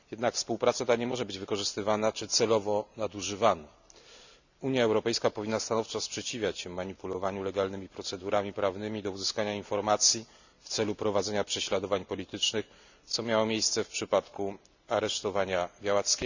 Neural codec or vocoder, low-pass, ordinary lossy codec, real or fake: none; 7.2 kHz; none; real